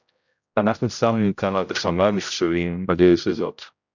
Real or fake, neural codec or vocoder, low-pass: fake; codec, 16 kHz, 0.5 kbps, X-Codec, HuBERT features, trained on general audio; 7.2 kHz